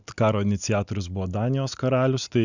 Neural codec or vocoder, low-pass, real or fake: none; 7.2 kHz; real